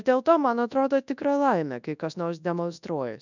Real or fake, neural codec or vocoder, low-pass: fake; codec, 24 kHz, 0.9 kbps, WavTokenizer, large speech release; 7.2 kHz